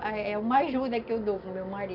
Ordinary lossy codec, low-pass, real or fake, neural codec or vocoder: none; 5.4 kHz; real; none